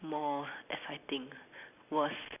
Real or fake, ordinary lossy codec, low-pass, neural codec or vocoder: real; none; 3.6 kHz; none